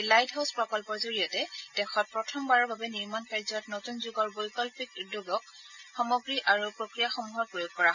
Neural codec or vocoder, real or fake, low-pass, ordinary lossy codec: none; real; 7.2 kHz; none